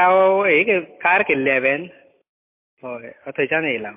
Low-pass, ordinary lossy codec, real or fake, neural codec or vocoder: 3.6 kHz; MP3, 24 kbps; real; none